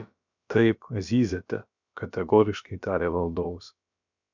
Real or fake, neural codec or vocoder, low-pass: fake; codec, 16 kHz, about 1 kbps, DyCAST, with the encoder's durations; 7.2 kHz